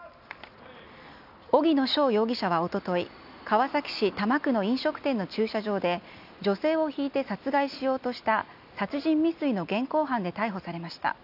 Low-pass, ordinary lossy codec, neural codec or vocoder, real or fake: 5.4 kHz; none; none; real